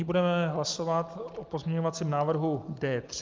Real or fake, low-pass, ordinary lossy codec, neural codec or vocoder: real; 7.2 kHz; Opus, 24 kbps; none